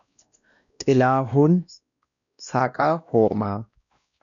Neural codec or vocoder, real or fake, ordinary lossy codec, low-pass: codec, 16 kHz, 1 kbps, X-Codec, WavLM features, trained on Multilingual LibriSpeech; fake; MP3, 96 kbps; 7.2 kHz